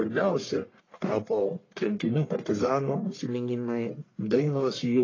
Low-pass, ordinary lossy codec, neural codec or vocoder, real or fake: 7.2 kHz; AAC, 32 kbps; codec, 44.1 kHz, 1.7 kbps, Pupu-Codec; fake